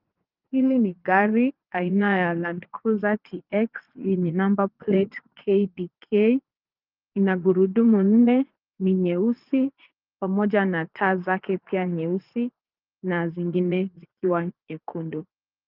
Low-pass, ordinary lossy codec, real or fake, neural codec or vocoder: 5.4 kHz; Opus, 24 kbps; fake; vocoder, 44.1 kHz, 80 mel bands, Vocos